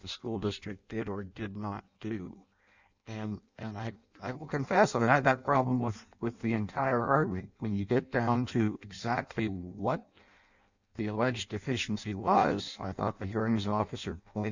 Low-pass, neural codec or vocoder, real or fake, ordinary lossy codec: 7.2 kHz; codec, 16 kHz in and 24 kHz out, 0.6 kbps, FireRedTTS-2 codec; fake; Opus, 64 kbps